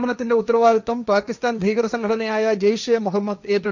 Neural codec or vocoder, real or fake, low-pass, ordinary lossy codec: codec, 16 kHz, 1.1 kbps, Voila-Tokenizer; fake; 7.2 kHz; none